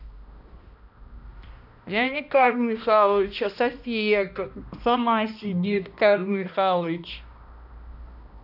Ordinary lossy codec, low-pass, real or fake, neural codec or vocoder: none; 5.4 kHz; fake; codec, 16 kHz, 1 kbps, X-Codec, HuBERT features, trained on balanced general audio